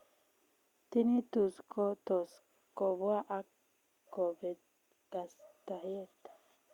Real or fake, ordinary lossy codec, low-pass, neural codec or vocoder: real; Opus, 64 kbps; 19.8 kHz; none